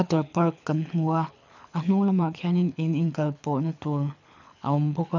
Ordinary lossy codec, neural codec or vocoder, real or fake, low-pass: none; codec, 24 kHz, 6 kbps, HILCodec; fake; 7.2 kHz